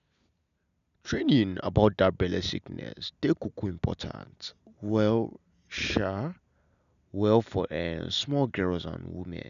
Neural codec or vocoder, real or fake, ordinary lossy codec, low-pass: none; real; none; 7.2 kHz